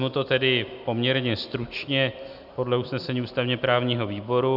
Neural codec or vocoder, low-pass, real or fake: none; 5.4 kHz; real